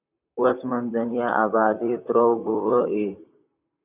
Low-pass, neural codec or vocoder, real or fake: 3.6 kHz; vocoder, 44.1 kHz, 128 mel bands, Pupu-Vocoder; fake